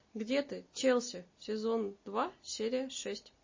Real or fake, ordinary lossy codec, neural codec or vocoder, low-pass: real; MP3, 32 kbps; none; 7.2 kHz